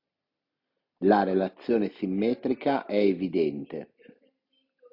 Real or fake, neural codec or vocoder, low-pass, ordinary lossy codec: real; none; 5.4 kHz; AAC, 48 kbps